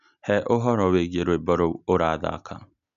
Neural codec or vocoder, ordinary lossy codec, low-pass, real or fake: none; none; 7.2 kHz; real